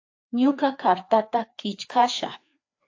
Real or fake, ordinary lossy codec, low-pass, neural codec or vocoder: fake; AAC, 48 kbps; 7.2 kHz; codec, 16 kHz, 2 kbps, FreqCodec, larger model